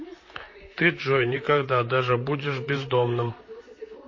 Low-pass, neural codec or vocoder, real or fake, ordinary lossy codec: 7.2 kHz; vocoder, 44.1 kHz, 128 mel bands, Pupu-Vocoder; fake; MP3, 32 kbps